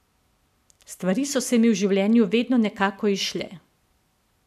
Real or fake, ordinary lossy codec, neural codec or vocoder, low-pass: real; none; none; 14.4 kHz